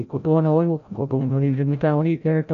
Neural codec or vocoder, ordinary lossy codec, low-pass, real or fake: codec, 16 kHz, 0.5 kbps, FreqCodec, larger model; none; 7.2 kHz; fake